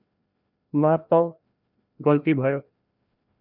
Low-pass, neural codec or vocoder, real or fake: 5.4 kHz; codec, 16 kHz, 1 kbps, FreqCodec, larger model; fake